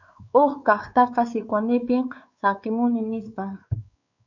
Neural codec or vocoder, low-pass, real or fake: codec, 16 kHz, 4 kbps, X-Codec, WavLM features, trained on Multilingual LibriSpeech; 7.2 kHz; fake